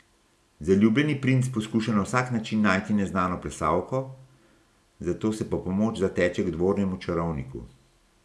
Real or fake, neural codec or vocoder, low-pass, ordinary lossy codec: real; none; none; none